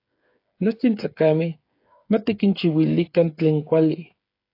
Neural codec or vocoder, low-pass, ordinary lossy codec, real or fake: codec, 16 kHz, 8 kbps, FreqCodec, smaller model; 5.4 kHz; AAC, 32 kbps; fake